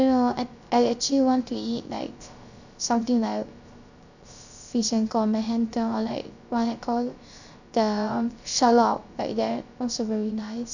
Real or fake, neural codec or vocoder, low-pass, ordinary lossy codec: fake; codec, 16 kHz, 0.3 kbps, FocalCodec; 7.2 kHz; none